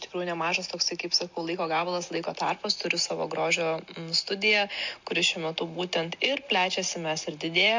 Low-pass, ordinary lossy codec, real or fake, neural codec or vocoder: 7.2 kHz; MP3, 48 kbps; real; none